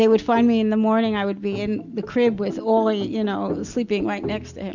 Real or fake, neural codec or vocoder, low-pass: real; none; 7.2 kHz